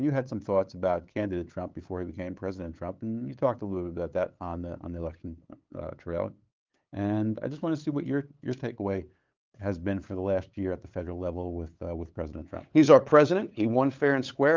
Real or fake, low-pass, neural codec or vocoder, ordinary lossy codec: fake; 7.2 kHz; codec, 16 kHz, 8 kbps, FunCodec, trained on LibriTTS, 25 frames a second; Opus, 32 kbps